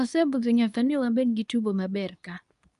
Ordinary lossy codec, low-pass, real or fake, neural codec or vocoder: none; 10.8 kHz; fake; codec, 24 kHz, 0.9 kbps, WavTokenizer, medium speech release version 2